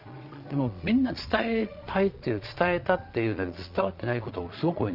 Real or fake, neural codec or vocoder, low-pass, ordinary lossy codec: fake; vocoder, 22.05 kHz, 80 mel bands, Vocos; 5.4 kHz; Opus, 32 kbps